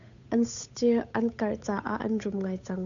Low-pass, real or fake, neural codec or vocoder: 7.2 kHz; fake; codec, 16 kHz, 8 kbps, FunCodec, trained on Chinese and English, 25 frames a second